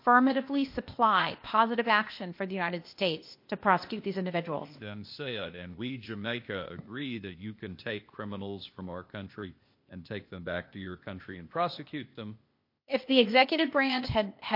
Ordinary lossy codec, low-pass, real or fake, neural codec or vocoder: MP3, 32 kbps; 5.4 kHz; fake; codec, 16 kHz, 0.8 kbps, ZipCodec